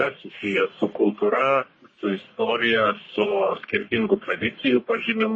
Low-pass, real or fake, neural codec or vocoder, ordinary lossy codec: 9.9 kHz; fake; codec, 44.1 kHz, 1.7 kbps, Pupu-Codec; MP3, 32 kbps